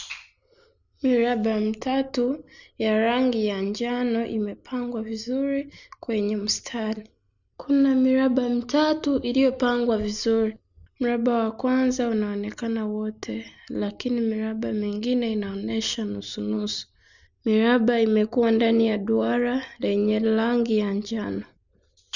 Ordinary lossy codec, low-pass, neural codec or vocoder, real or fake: none; 7.2 kHz; none; real